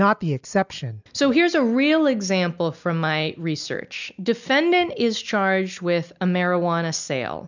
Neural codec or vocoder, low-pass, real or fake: none; 7.2 kHz; real